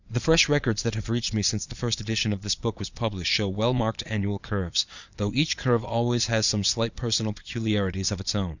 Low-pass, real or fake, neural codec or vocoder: 7.2 kHz; fake; vocoder, 22.05 kHz, 80 mel bands, Vocos